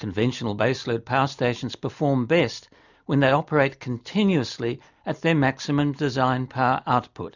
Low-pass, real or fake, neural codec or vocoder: 7.2 kHz; real; none